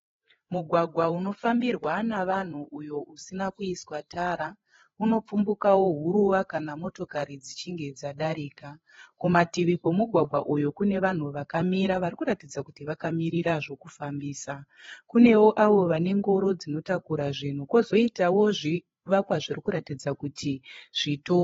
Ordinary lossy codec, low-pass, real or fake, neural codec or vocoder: AAC, 24 kbps; 7.2 kHz; fake; codec, 16 kHz, 8 kbps, FreqCodec, larger model